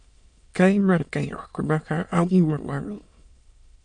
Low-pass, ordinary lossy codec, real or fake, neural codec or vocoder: 9.9 kHz; MP3, 64 kbps; fake; autoencoder, 22.05 kHz, a latent of 192 numbers a frame, VITS, trained on many speakers